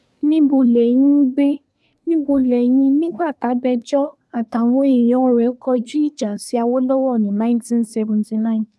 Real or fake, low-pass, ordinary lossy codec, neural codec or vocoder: fake; none; none; codec, 24 kHz, 1 kbps, SNAC